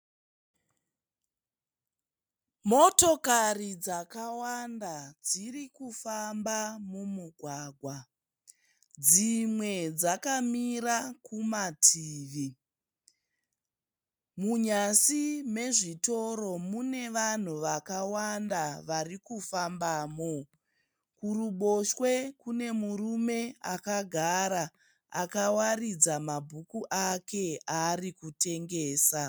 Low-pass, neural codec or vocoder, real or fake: 19.8 kHz; none; real